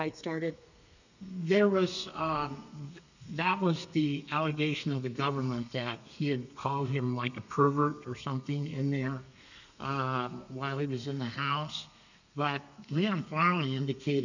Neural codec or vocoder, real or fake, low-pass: codec, 32 kHz, 1.9 kbps, SNAC; fake; 7.2 kHz